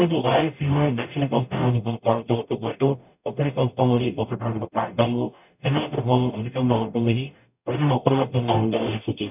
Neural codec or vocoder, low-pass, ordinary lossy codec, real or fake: codec, 44.1 kHz, 0.9 kbps, DAC; 3.6 kHz; none; fake